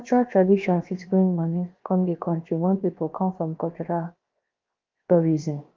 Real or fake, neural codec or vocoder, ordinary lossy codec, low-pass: fake; codec, 16 kHz, 0.7 kbps, FocalCodec; Opus, 24 kbps; 7.2 kHz